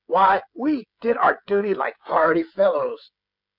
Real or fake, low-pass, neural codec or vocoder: fake; 5.4 kHz; codec, 16 kHz, 16 kbps, FreqCodec, smaller model